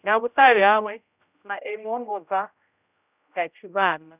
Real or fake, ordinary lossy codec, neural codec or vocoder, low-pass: fake; none; codec, 16 kHz, 0.5 kbps, X-Codec, HuBERT features, trained on general audio; 3.6 kHz